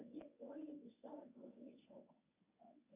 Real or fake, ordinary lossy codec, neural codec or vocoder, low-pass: fake; MP3, 32 kbps; codec, 24 kHz, 0.9 kbps, WavTokenizer, medium speech release version 1; 3.6 kHz